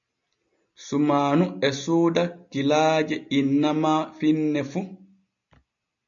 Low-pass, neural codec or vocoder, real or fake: 7.2 kHz; none; real